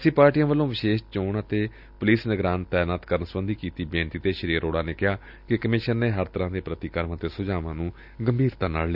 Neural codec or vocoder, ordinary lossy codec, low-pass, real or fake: none; none; 5.4 kHz; real